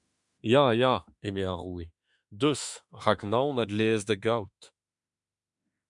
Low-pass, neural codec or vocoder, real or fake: 10.8 kHz; autoencoder, 48 kHz, 32 numbers a frame, DAC-VAE, trained on Japanese speech; fake